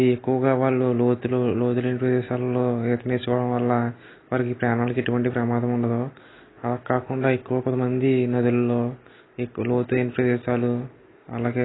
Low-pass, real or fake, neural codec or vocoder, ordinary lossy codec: 7.2 kHz; real; none; AAC, 16 kbps